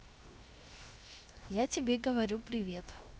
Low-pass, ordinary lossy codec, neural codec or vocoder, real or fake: none; none; codec, 16 kHz, 0.7 kbps, FocalCodec; fake